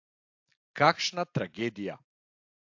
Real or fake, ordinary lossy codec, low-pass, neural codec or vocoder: real; AAC, 48 kbps; 7.2 kHz; none